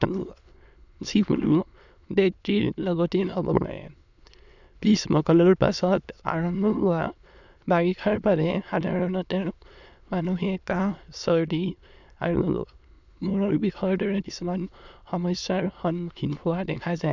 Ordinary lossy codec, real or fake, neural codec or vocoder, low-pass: none; fake; autoencoder, 22.05 kHz, a latent of 192 numbers a frame, VITS, trained on many speakers; 7.2 kHz